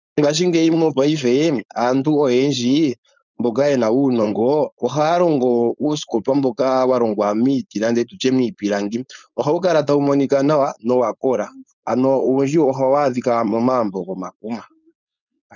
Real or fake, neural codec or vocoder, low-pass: fake; codec, 16 kHz, 4.8 kbps, FACodec; 7.2 kHz